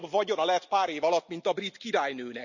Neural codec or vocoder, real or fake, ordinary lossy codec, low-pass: none; real; none; 7.2 kHz